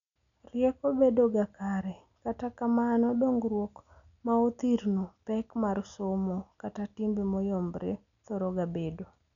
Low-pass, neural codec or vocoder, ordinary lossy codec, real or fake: 7.2 kHz; none; none; real